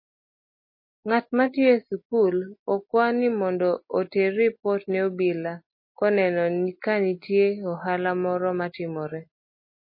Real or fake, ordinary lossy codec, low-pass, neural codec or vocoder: real; MP3, 24 kbps; 5.4 kHz; none